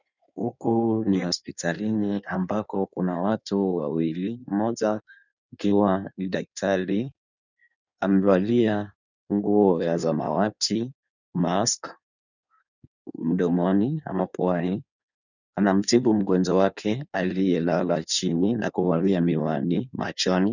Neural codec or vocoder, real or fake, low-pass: codec, 16 kHz in and 24 kHz out, 1.1 kbps, FireRedTTS-2 codec; fake; 7.2 kHz